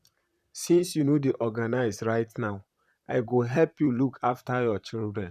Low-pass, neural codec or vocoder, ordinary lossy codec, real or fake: 14.4 kHz; vocoder, 44.1 kHz, 128 mel bands, Pupu-Vocoder; none; fake